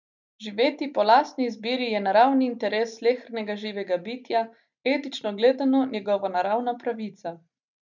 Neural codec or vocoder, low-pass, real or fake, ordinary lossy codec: none; 7.2 kHz; real; none